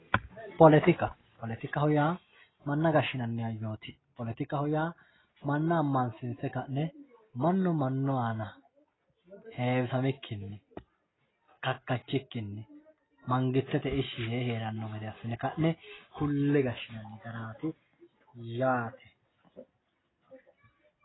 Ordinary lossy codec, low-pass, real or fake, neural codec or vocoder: AAC, 16 kbps; 7.2 kHz; real; none